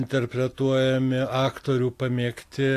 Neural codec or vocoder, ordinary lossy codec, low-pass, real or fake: none; AAC, 64 kbps; 14.4 kHz; real